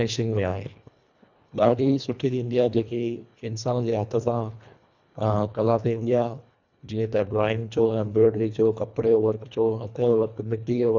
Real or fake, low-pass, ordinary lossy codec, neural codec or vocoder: fake; 7.2 kHz; none; codec, 24 kHz, 1.5 kbps, HILCodec